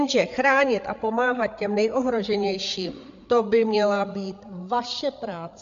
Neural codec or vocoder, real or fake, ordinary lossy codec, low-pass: codec, 16 kHz, 8 kbps, FreqCodec, larger model; fake; MP3, 64 kbps; 7.2 kHz